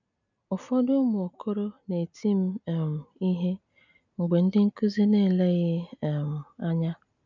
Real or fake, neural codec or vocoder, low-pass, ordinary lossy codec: real; none; 7.2 kHz; none